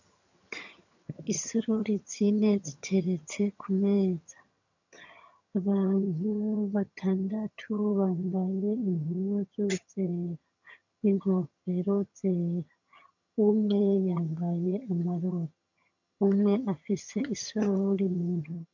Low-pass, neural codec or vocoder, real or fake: 7.2 kHz; vocoder, 22.05 kHz, 80 mel bands, HiFi-GAN; fake